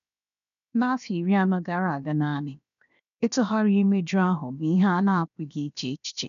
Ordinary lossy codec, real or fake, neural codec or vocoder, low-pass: none; fake; codec, 16 kHz, 0.7 kbps, FocalCodec; 7.2 kHz